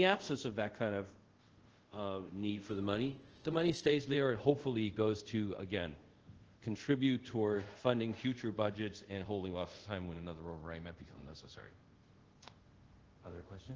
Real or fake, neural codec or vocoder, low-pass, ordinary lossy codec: fake; codec, 24 kHz, 0.5 kbps, DualCodec; 7.2 kHz; Opus, 16 kbps